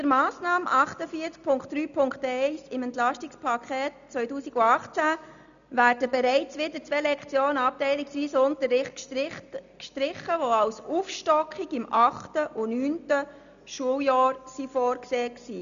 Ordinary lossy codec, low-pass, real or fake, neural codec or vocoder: none; 7.2 kHz; real; none